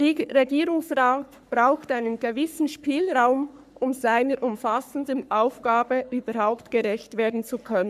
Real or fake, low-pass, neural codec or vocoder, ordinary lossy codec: fake; 14.4 kHz; codec, 44.1 kHz, 3.4 kbps, Pupu-Codec; none